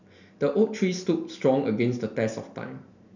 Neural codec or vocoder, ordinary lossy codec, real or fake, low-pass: none; none; real; 7.2 kHz